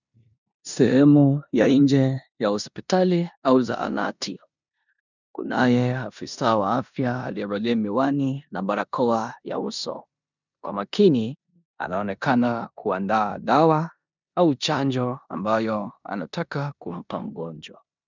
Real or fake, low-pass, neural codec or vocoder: fake; 7.2 kHz; codec, 16 kHz in and 24 kHz out, 0.9 kbps, LongCat-Audio-Codec, four codebook decoder